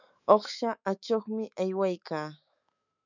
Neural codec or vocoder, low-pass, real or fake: autoencoder, 48 kHz, 128 numbers a frame, DAC-VAE, trained on Japanese speech; 7.2 kHz; fake